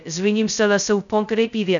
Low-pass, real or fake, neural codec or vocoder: 7.2 kHz; fake; codec, 16 kHz, 0.2 kbps, FocalCodec